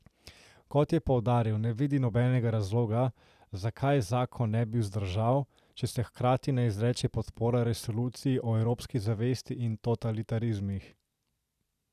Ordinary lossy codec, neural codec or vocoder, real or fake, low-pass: none; none; real; 14.4 kHz